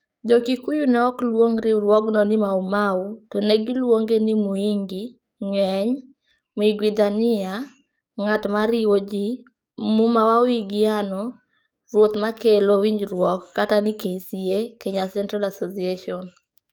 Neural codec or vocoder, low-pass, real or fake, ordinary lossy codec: codec, 44.1 kHz, 7.8 kbps, DAC; 19.8 kHz; fake; none